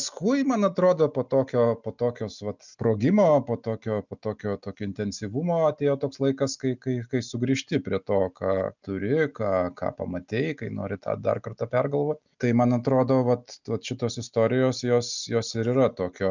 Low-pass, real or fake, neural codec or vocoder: 7.2 kHz; real; none